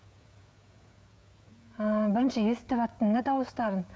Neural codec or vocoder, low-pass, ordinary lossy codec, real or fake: codec, 16 kHz, 16 kbps, FreqCodec, smaller model; none; none; fake